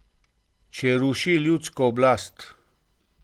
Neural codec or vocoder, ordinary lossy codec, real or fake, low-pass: none; Opus, 16 kbps; real; 19.8 kHz